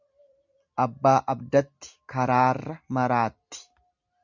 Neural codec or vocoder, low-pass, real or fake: none; 7.2 kHz; real